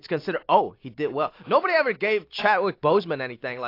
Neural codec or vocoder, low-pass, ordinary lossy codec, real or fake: none; 5.4 kHz; AAC, 32 kbps; real